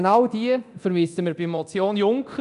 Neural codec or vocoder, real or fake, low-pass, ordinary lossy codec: codec, 24 kHz, 0.9 kbps, DualCodec; fake; 10.8 kHz; none